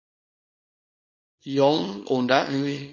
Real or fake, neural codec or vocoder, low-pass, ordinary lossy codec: fake; codec, 24 kHz, 0.9 kbps, WavTokenizer, small release; 7.2 kHz; MP3, 32 kbps